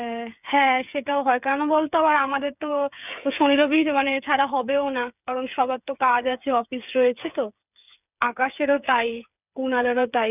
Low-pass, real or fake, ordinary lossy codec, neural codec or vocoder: 3.6 kHz; fake; none; codec, 16 kHz, 8 kbps, FreqCodec, smaller model